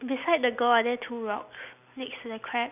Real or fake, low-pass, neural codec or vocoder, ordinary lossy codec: real; 3.6 kHz; none; none